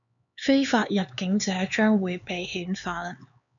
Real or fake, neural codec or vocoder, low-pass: fake; codec, 16 kHz, 4 kbps, X-Codec, HuBERT features, trained on LibriSpeech; 7.2 kHz